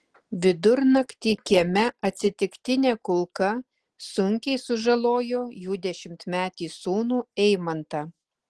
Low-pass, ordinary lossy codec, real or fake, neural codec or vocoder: 10.8 kHz; Opus, 16 kbps; real; none